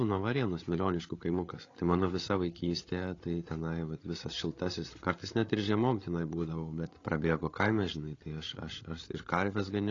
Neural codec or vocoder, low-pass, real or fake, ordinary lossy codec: codec, 16 kHz, 8 kbps, FreqCodec, larger model; 7.2 kHz; fake; AAC, 32 kbps